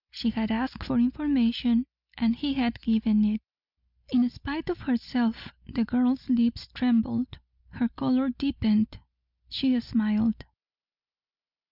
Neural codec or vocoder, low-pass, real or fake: none; 5.4 kHz; real